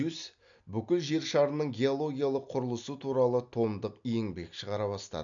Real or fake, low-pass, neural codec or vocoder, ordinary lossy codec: real; 7.2 kHz; none; none